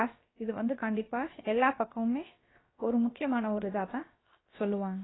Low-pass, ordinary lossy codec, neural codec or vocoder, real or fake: 7.2 kHz; AAC, 16 kbps; codec, 16 kHz, about 1 kbps, DyCAST, with the encoder's durations; fake